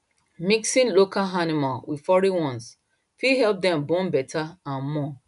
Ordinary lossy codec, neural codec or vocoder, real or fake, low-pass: none; none; real; 10.8 kHz